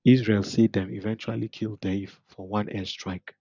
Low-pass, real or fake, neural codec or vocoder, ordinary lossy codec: 7.2 kHz; real; none; none